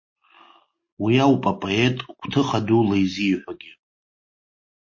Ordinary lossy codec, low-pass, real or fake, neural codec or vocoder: MP3, 32 kbps; 7.2 kHz; real; none